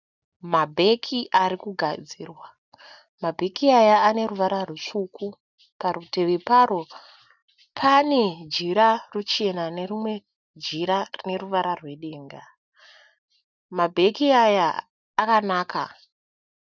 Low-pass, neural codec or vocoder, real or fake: 7.2 kHz; none; real